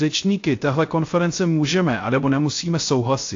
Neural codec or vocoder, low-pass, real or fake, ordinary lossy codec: codec, 16 kHz, 0.3 kbps, FocalCodec; 7.2 kHz; fake; AAC, 48 kbps